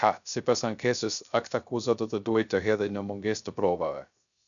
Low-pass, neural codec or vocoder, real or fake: 7.2 kHz; codec, 16 kHz, 0.3 kbps, FocalCodec; fake